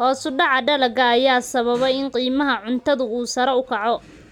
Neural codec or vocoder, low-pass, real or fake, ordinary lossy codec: none; 19.8 kHz; real; none